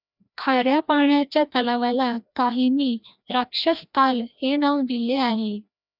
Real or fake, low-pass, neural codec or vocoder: fake; 5.4 kHz; codec, 16 kHz, 1 kbps, FreqCodec, larger model